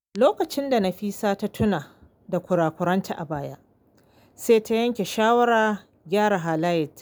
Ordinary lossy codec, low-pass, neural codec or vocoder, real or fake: none; none; none; real